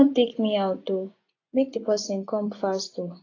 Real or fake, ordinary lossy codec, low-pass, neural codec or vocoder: fake; AAC, 32 kbps; 7.2 kHz; vocoder, 24 kHz, 100 mel bands, Vocos